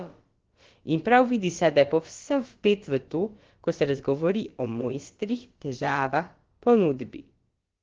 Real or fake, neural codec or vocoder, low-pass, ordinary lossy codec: fake; codec, 16 kHz, about 1 kbps, DyCAST, with the encoder's durations; 7.2 kHz; Opus, 16 kbps